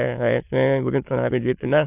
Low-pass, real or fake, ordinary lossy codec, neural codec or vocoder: 3.6 kHz; fake; AAC, 32 kbps; autoencoder, 22.05 kHz, a latent of 192 numbers a frame, VITS, trained on many speakers